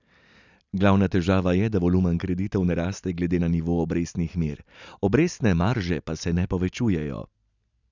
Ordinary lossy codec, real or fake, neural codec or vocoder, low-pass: none; real; none; 7.2 kHz